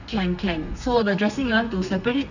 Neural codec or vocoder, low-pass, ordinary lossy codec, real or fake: codec, 32 kHz, 1.9 kbps, SNAC; 7.2 kHz; none; fake